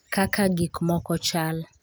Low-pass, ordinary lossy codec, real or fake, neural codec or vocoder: none; none; real; none